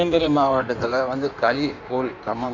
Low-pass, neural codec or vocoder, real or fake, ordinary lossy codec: 7.2 kHz; codec, 16 kHz in and 24 kHz out, 1.1 kbps, FireRedTTS-2 codec; fake; none